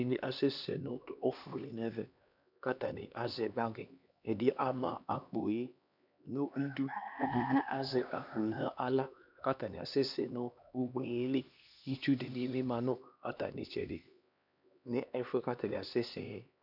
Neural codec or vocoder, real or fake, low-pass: codec, 16 kHz, 2 kbps, X-Codec, HuBERT features, trained on LibriSpeech; fake; 5.4 kHz